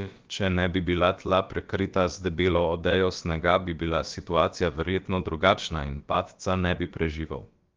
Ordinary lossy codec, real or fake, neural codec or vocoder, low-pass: Opus, 32 kbps; fake; codec, 16 kHz, about 1 kbps, DyCAST, with the encoder's durations; 7.2 kHz